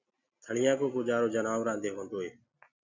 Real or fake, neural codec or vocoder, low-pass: real; none; 7.2 kHz